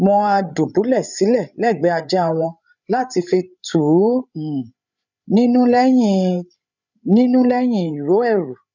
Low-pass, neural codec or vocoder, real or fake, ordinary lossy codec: 7.2 kHz; codec, 16 kHz, 8 kbps, FreqCodec, larger model; fake; none